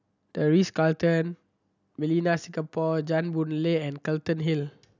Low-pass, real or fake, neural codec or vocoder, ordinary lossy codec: 7.2 kHz; real; none; none